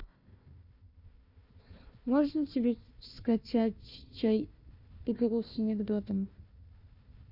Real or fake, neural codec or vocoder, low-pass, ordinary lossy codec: fake; codec, 16 kHz, 1 kbps, FunCodec, trained on Chinese and English, 50 frames a second; 5.4 kHz; none